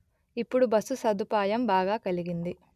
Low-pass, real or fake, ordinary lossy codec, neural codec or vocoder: 14.4 kHz; real; none; none